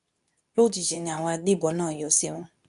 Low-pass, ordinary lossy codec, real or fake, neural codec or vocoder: 10.8 kHz; none; fake; codec, 24 kHz, 0.9 kbps, WavTokenizer, medium speech release version 2